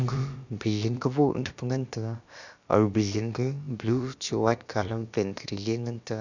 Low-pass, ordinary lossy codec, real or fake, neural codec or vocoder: 7.2 kHz; none; fake; codec, 16 kHz, about 1 kbps, DyCAST, with the encoder's durations